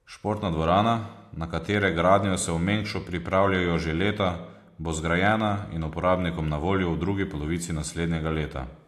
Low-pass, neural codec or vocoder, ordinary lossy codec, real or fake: 14.4 kHz; none; AAC, 64 kbps; real